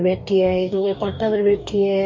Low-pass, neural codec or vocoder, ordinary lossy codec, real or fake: 7.2 kHz; codec, 44.1 kHz, 2.6 kbps, DAC; MP3, 48 kbps; fake